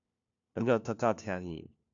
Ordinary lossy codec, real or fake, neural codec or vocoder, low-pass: AAC, 64 kbps; fake; codec, 16 kHz, 1 kbps, FunCodec, trained on LibriTTS, 50 frames a second; 7.2 kHz